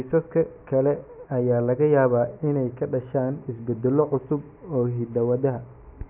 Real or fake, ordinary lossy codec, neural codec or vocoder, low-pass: real; none; none; 3.6 kHz